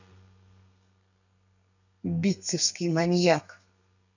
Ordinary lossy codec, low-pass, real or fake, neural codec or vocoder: none; 7.2 kHz; fake; codec, 32 kHz, 1.9 kbps, SNAC